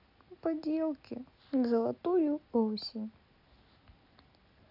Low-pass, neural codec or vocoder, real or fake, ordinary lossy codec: 5.4 kHz; none; real; none